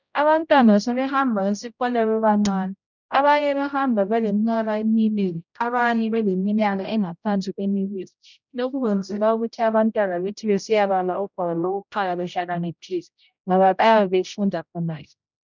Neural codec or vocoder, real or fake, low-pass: codec, 16 kHz, 0.5 kbps, X-Codec, HuBERT features, trained on general audio; fake; 7.2 kHz